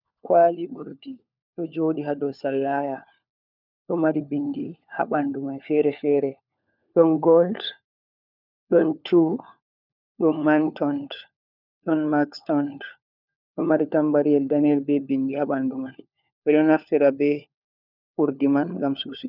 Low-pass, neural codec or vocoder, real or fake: 5.4 kHz; codec, 16 kHz, 4 kbps, FunCodec, trained on LibriTTS, 50 frames a second; fake